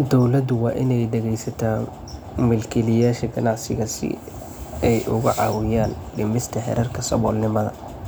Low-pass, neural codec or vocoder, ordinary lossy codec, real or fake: none; none; none; real